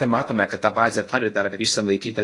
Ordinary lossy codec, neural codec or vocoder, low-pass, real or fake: AAC, 48 kbps; codec, 16 kHz in and 24 kHz out, 0.6 kbps, FocalCodec, streaming, 4096 codes; 10.8 kHz; fake